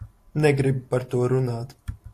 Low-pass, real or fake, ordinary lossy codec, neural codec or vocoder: 14.4 kHz; real; AAC, 64 kbps; none